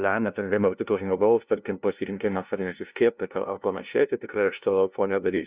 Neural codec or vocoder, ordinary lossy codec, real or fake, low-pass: codec, 16 kHz, 0.5 kbps, FunCodec, trained on LibriTTS, 25 frames a second; Opus, 64 kbps; fake; 3.6 kHz